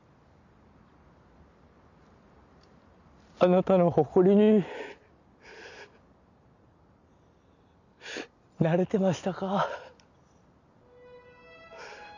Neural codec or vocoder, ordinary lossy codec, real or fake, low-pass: none; none; real; 7.2 kHz